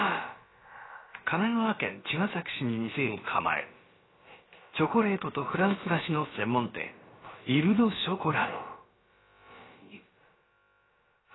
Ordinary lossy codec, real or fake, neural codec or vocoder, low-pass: AAC, 16 kbps; fake; codec, 16 kHz, about 1 kbps, DyCAST, with the encoder's durations; 7.2 kHz